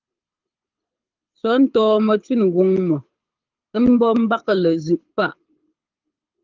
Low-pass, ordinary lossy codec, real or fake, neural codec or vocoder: 7.2 kHz; Opus, 32 kbps; fake; codec, 24 kHz, 6 kbps, HILCodec